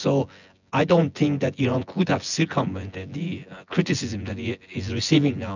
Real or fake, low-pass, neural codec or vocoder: fake; 7.2 kHz; vocoder, 24 kHz, 100 mel bands, Vocos